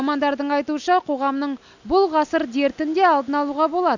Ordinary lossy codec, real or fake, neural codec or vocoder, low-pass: none; real; none; 7.2 kHz